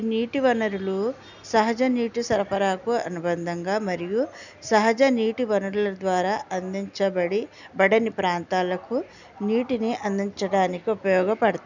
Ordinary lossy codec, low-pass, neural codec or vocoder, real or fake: none; 7.2 kHz; none; real